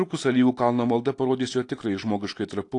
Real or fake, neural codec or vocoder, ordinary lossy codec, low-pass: real; none; AAC, 48 kbps; 10.8 kHz